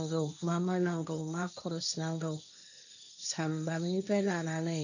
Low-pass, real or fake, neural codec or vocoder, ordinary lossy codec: 7.2 kHz; fake; codec, 16 kHz, 1.1 kbps, Voila-Tokenizer; none